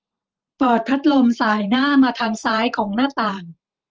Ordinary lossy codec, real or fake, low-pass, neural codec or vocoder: Opus, 24 kbps; fake; 7.2 kHz; vocoder, 44.1 kHz, 128 mel bands every 512 samples, BigVGAN v2